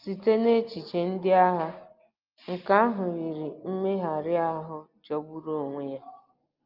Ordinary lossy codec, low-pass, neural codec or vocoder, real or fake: Opus, 32 kbps; 5.4 kHz; none; real